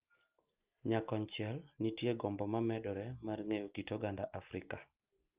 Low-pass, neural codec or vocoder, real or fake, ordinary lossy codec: 3.6 kHz; none; real; Opus, 32 kbps